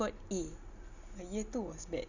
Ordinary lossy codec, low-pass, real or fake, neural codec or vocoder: none; 7.2 kHz; real; none